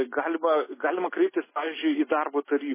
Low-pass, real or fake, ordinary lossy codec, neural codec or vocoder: 3.6 kHz; real; MP3, 16 kbps; none